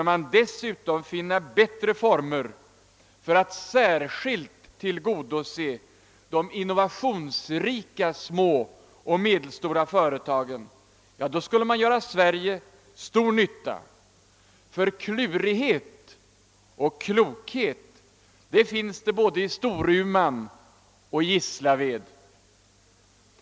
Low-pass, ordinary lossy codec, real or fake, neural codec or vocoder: none; none; real; none